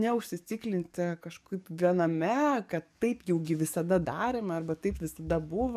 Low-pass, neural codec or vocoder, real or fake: 14.4 kHz; codec, 44.1 kHz, 7.8 kbps, DAC; fake